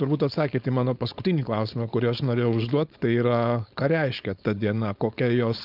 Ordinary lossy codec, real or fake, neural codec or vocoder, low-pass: Opus, 24 kbps; fake; codec, 16 kHz, 4.8 kbps, FACodec; 5.4 kHz